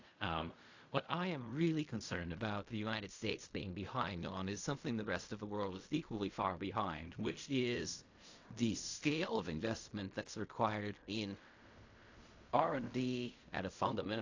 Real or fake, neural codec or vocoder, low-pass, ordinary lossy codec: fake; codec, 16 kHz in and 24 kHz out, 0.4 kbps, LongCat-Audio-Codec, fine tuned four codebook decoder; 7.2 kHz; AAC, 48 kbps